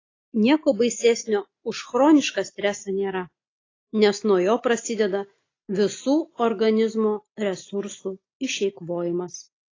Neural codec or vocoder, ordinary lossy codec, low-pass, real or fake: none; AAC, 32 kbps; 7.2 kHz; real